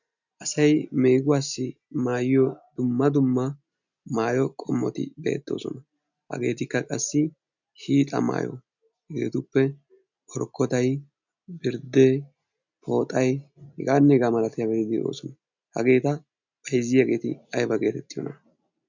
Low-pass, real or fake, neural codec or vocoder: 7.2 kHz; real; none